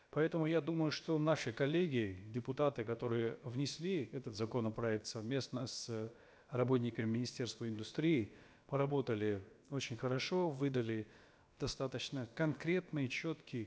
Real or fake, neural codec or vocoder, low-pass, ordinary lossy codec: fake; codec, 16 kHz, about 1 kbps, DyCAST, with the encoder's durations; none; none